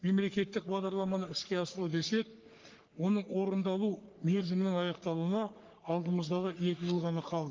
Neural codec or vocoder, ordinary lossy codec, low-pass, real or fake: codec, 44.1 kHz, 3.4 kbps, Pupu-Codec; Opus, 32 kbps; 7.2 kHz; fake